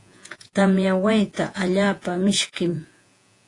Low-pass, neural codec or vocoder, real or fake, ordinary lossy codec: 10.8 kHz; vocoder, 48 kHz, 128 mel bands, Vocos; fake; AAC, 48 kbps